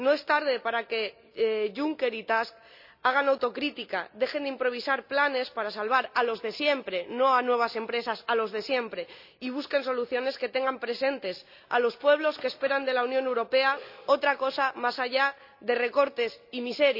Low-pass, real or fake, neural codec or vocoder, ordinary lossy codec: 5.4 kHz; real; none; none